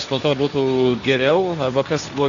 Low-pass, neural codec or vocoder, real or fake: 7.2 kHz; codec, 16 kHz, 1.1 kbps, Voila-Tokenizer; fake